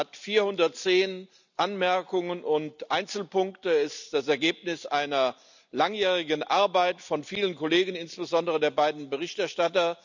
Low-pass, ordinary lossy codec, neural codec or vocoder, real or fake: 7.2 kHz; none; none; real